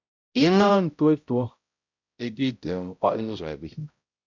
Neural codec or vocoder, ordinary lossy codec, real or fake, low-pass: codec, 16 kHz, 0.5 kbps, X-Codec, HuBERT features, trained on balanced general audio; MP3, 64 kbps; fake; 7.2 kHz